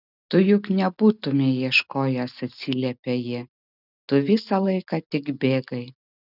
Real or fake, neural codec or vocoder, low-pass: real; none; 5.4 kHz